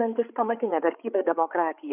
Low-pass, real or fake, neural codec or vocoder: 3.6 kHz; fake; codec, 16 kHz, 8 kbps, FreqCodec, larger model